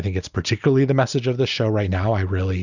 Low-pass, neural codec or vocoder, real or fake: 7.2 kHz; none; real